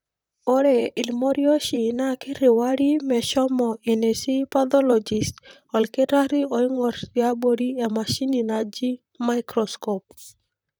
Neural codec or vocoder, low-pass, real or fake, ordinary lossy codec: vocoder, 44.1 kHz, 128 mel bands, Pupu-Vocoder; none; fake; none